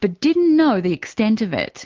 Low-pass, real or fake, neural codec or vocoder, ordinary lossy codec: 7.2 kHz; real; none; Opus, 16 kbps